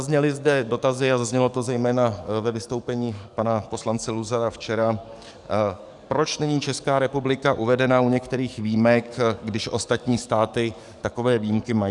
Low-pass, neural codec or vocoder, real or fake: 10.8 kHz; codec, 44.1 kHz, 7.8 kbps, DAC; fake